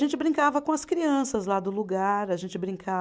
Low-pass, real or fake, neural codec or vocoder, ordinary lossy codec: none; real; none; none